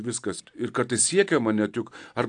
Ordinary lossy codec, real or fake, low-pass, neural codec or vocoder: AAC, 64 kbps; real; 9.9 kHz; none